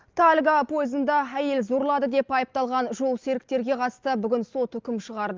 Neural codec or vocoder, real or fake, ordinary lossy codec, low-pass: none; real; Opus, 24 kbps; 7.2 kHz